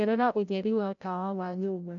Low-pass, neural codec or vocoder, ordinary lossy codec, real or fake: 7.2 kHz; codec, 16 kHz, 0.5 kbps, FreqCodec, larger model; none; fake